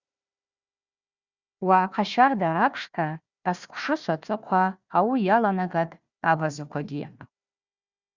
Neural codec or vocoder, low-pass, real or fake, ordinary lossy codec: codec, 16 kHz, 1 kbps, FunCodec, trained on Chinese and English, 50 frames a second; 7.2 kHz; fake; Opus, 64 kbps